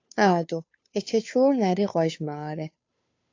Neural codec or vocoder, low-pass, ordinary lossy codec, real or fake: codec, 16 kHz, 2 kbps, FunCodec, trained on Chinese and English, 25 frames a second; 7.2 kHz; AAC, 48 kbps; fake